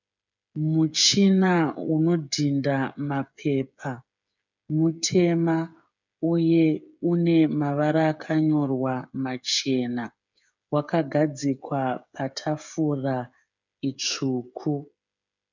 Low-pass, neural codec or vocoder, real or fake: 7.2 kHz; codec, 16 kHz, 8 kbps, FreqCodec, smaller model; fake